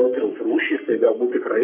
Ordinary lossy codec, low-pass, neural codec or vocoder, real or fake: MP3, 24 kbps; 3.6 kHz; codec, 44.1 kHz, 3.4 kbps, Pupu-Codec; fake